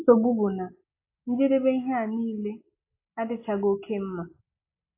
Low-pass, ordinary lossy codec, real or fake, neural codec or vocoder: 3.6 kHz; AAC, 24 kbps; real; none